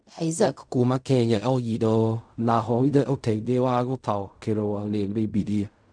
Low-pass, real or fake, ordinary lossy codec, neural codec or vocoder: 9.9 kHz; fake; none; codec, 16 kHz in and 24 kHz out, 0.4 kbps, LongCat-Audio-Codec, fine tuned four codebook decoder